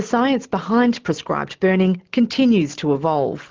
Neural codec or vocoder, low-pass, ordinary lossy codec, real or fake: none; 7.2 kHz; Opus, 16 kbps; real